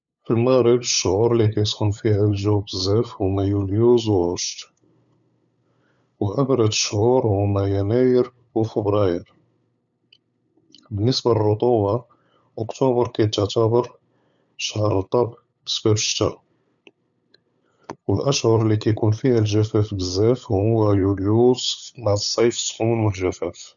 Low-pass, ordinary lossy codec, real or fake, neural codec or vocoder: 7.2 kHz; none; fake; codec, 16 kHz, 8 kbps, FunCodec, trained on LibriTTS, 25 frames a second